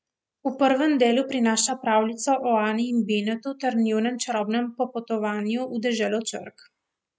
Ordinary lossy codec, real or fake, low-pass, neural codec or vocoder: none; real; none; none